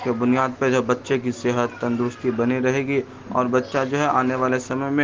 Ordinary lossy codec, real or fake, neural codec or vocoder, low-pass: Opus, 16 kbps; real; none; 7.2 kHz